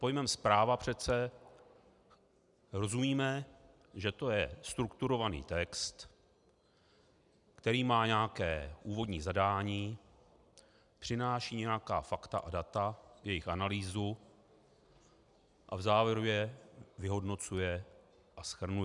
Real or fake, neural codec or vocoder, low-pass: real; none; 10.8 kHz